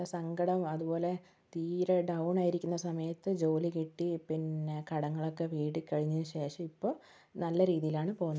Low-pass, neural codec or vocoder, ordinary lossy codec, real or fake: none; none; none; real